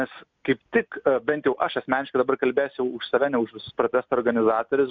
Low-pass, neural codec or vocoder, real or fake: 7.2 kHz; none; real